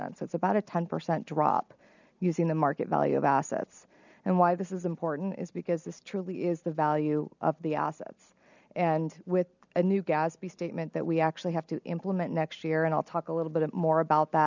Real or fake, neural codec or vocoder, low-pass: real; none; 7.2 kHz